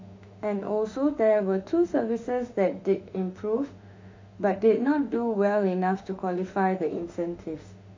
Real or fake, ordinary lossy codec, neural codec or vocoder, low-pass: fake; MP3, 64 kbps; autoencoder, 48 kHz, 32 numbers a frame, DAC-VAE, trained on Japanese speech; 7.2 kHz